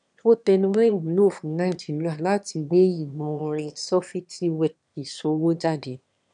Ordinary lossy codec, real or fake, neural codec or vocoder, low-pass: none; fake; autoencoder, 22.05 kHz, a latent of 192 numbers a frame, VITS, trained on one speaker; 9.9 kHz